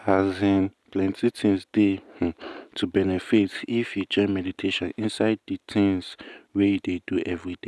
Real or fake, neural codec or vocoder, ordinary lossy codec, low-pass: real; none; none; none